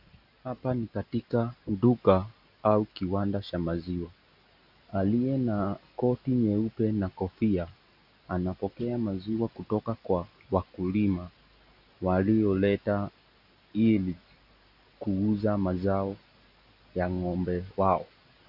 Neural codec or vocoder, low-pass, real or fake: none; 5.4 kHz; real